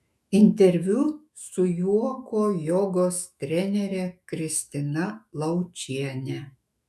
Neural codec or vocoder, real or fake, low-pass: autoencoder, 48 kHz, 128 numbers a frame, DAC-VAE, trained on Japanese speech; fake; 14.4 kHz